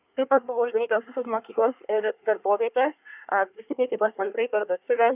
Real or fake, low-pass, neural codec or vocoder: fake; 3.6 kHz; codec, 24 kHz, 1 kbps, SNAC